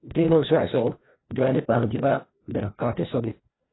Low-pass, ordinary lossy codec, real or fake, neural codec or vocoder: 7.2 kHz; AAC, 16 kbps; fake; codec, 16 kHz, 1 kbps, FreqCodec, larger model